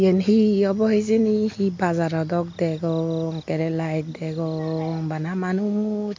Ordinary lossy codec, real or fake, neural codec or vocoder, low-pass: MP3, 48 kbps; real; none; 7.2 kHz